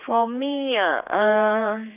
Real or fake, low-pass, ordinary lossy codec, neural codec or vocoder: fake; 3.6 kHz; none; codec, 16 kHz, 4 kbps, X-Codec, HuBERT features, trained on general audio